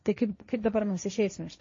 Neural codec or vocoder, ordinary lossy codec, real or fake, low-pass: codec, 16 kHz, 1.1 kbps, Voila-Tokenizer; MP3, 32 kbps; fake; 7.2 kHz